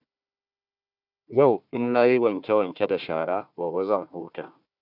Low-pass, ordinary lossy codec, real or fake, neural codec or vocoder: 5.4 kHz; none; fake; codec, 16 kHz, 1 kbps, FunCodec, trained on Chinese and English, 50 frames a second